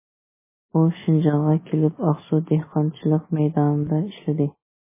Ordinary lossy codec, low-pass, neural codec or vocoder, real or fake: MP3, 16 kbps; 3.6 kHz; none; real